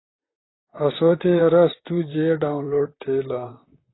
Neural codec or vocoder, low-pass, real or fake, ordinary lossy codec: vocoder, 44.1 kHz, 128 mel bands, Pupu-Vocoder; 7.2 kHz; fake; AAC, 16 kbps